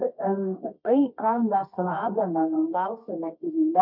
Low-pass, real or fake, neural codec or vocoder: 5.4 kHz; fake; codec, 24 kHz, 0.9 kbps, WavTokenizer, medium music audio release